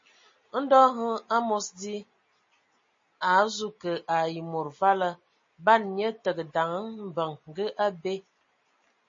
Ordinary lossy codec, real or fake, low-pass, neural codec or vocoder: MP3, 32 kbps; real; 7.2 kHz; none